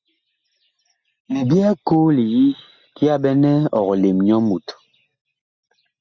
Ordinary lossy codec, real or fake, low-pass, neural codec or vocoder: Opus, 64 kbps; real; 7.2 kHz; none